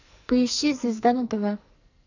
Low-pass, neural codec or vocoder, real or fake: 7.2 kHz; codec, 44.1 kHz, 2.6 kbps, SNAC; fake